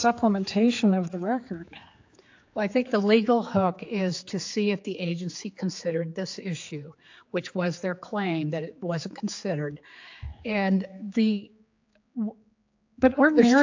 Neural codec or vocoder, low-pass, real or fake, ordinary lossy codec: codec, 16 kHz, 4 kbps, X-Codec, HuBERT features, trained on general audio; 7.2 kHz; fake; AAC, 48 kbps